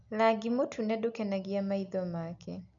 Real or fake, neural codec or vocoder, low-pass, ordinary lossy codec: real; none; 7.2 kHz; none